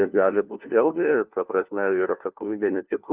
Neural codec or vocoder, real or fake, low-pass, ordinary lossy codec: codec, 16 kHz, 1 kbps, FunCodec, trained on LibriTTS, 50 frames a second; fake; 3.6 kHz; Opus, 32 kbps